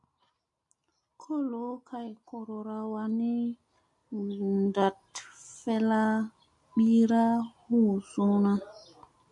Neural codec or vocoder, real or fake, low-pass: none; real; 10.8 kHz